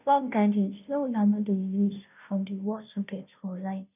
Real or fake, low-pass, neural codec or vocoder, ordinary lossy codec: fake; 3.6 kHz; codec, 16 kHz, 0.5 kbps, FunCodec, trained on Chinese and English, 25 frames a second; none